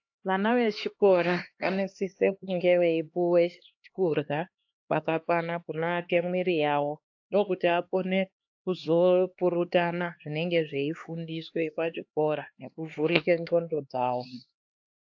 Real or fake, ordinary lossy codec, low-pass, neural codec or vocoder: fake; AAC, 48 kbps; 7.2 kHz; codec, 16 kHz, 2 kbps, X-Codec, HuBERT features, trained on LibriSpeech